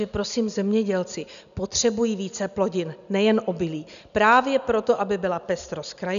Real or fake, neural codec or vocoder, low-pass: real; none; 7.2 kHz